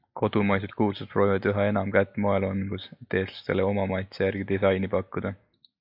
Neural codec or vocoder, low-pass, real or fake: none; 5.4 kHz; real